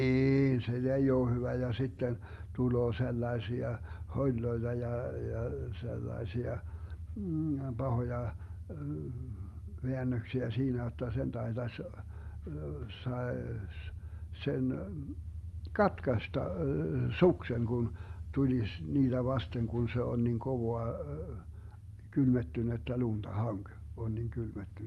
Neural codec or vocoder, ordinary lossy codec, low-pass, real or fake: none; Opus, 32 kbps; 19.8 kHz; real